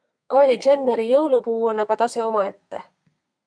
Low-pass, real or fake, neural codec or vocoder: 9.9 kHz; fake; codec, 32 kHz, 1.9 kbps, SNAC